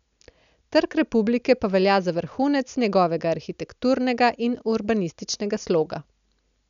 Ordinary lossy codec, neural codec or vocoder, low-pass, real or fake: none; none; 7.2 kHz; real